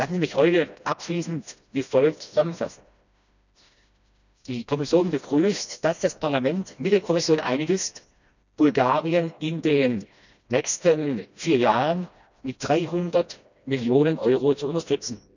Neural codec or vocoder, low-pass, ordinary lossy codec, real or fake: codec, 16 kHz, 1 kbps, FreqCodec, smaller model; 7.2 kHz; none; fake